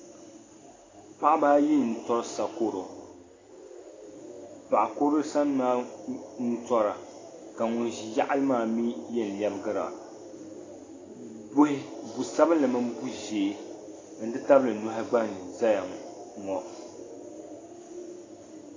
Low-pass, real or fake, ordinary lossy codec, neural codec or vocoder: 7.2 kHz; real; AAC, 32 kbps; none